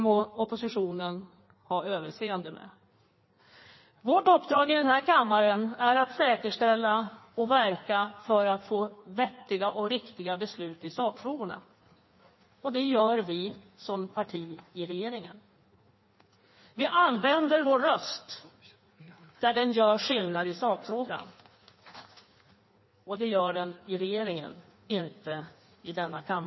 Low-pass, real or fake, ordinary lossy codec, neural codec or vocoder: 7.2 kHz; fake; MP3, 24 kbps; codec, 16 kHz in and 24 kHz out, 1.1 kbps, FireRedTTS-2 codec